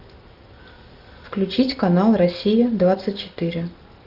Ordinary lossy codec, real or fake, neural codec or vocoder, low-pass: Opus, 32 kbps; real; none; 5.4 kHz